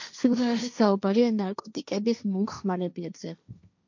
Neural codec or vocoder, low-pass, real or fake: codec, 16 kHz, 1.1 kbps, Voila-Tokenizer; 7.2 kHz; fake